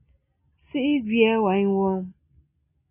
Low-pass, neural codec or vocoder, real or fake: 3.6 kHz; none; real